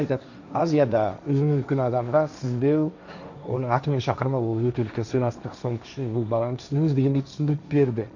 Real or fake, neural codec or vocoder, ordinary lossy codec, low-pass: fake; codec, 16 kHz, 1.1 kbps, Voila-Tokenizer; none; 7.2 kHz